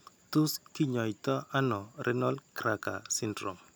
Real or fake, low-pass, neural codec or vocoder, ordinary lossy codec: real; none; none; none